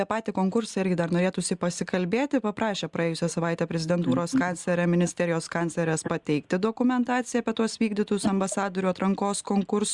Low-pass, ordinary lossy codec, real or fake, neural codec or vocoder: 10.8 kHz; Opus, 64 kbps; real; none